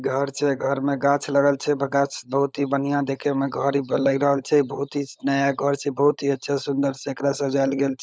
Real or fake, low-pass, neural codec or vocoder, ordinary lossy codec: fake; none; codec, 16 kHz, 16 kbps, FunCodec, trained on LibriTTS, 50 frames a second; none